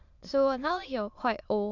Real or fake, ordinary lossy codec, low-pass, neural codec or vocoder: fake; none; 7.2 kHz; autoencoder, 22.05 kHz, a latent of 192 numbers a frame, VITS, trained on many speakers